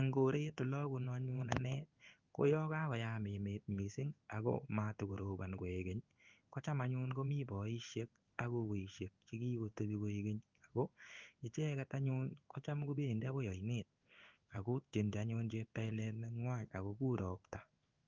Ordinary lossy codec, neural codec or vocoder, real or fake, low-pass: Opus, 32 kbps; codec, 16 kHz in and 24 kHz out, 1 kbps, XY-Tokenizer; fake; 7.2 kHz